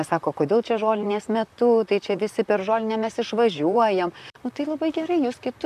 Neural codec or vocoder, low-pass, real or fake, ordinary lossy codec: vocoder, 44.1 kHz, 128 mel bands, Pupu-Vocoder; 14.4 kHz; fake; MP3, 96 kbps